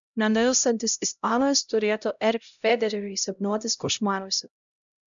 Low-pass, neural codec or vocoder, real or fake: 7.2 kHz; codec, 16 kHz, 0.5 kbps, X-Codec, HuBERT features, trained on LibriSpeech; fake